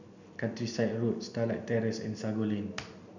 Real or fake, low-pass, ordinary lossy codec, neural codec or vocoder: real; 7.2 kHz; none; none